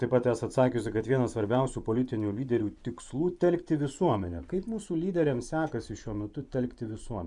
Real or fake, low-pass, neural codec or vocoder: fake; 10.8 kHz; vocoder, 24 kHz, 100 mel bands, Vocos